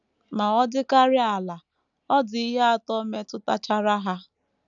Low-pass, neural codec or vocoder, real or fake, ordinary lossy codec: 7.2 kHz; none; real; none